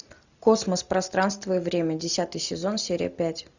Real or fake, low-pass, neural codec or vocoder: real; 7.2 kHz; none